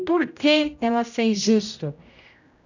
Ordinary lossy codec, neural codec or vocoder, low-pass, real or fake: none; codec, 16 kHz, 0.5 kbps, X-Codec, HuBERT features, trained on general audio; 7.2 kHz; fake